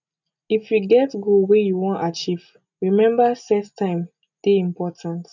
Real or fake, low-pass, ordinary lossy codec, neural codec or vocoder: real; 7.2 kHz; none; none